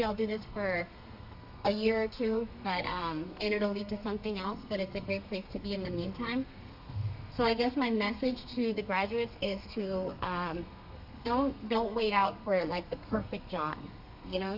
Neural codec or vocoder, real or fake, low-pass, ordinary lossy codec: codec, 32 kHz, 1.9 kbps, SNAC; fake; 5.4 kHz; MP3, 48 kbps